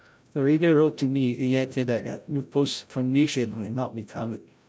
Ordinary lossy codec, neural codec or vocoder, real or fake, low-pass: none; codec, 16 kHz, 0.5 kbps, FreqCodec, larger model; fake; none